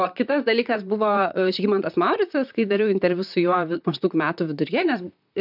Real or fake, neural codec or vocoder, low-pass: fake; vocoder, 44.1 kHz, 128 mel bands, Pupu-Vocoder; 5.4 kHz